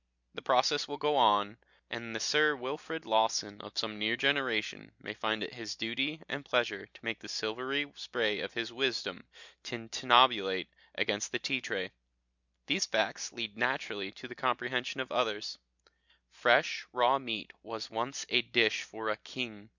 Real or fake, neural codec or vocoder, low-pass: real; none; 7.2 kHz